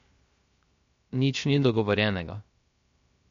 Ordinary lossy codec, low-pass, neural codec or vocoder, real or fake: MP3, 48 kbps; 7.2 kHz; codec, 16 kHz, 0.3 kbps, FocalCodec; fake